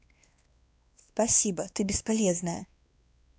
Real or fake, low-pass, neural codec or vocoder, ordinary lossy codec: fake; none; codec, 16 kHz, 2 kbps, X-Codec, WavLM features, trained on Multilingual LibriSpeech; none